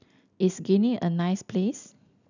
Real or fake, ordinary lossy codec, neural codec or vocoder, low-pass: real; none; none; 7.2 kHz